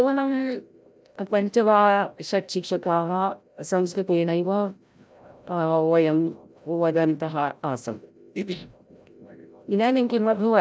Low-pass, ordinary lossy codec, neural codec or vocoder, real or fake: none; none; codec, 16 kHz, 0.5 kbps, FreqCodec, larger model; fake